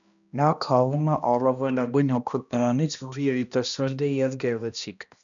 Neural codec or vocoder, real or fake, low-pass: codec, 16 kHz, 1 kbps, X-Codec, HuBERT features, trained on balanced general audio; fake; 7.2 kHz